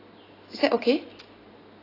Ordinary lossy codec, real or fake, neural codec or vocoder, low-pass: AAC, 24 kbps; real; none; 5.4 kHz